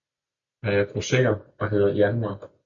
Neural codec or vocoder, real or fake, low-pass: none; real; 7.2 kHz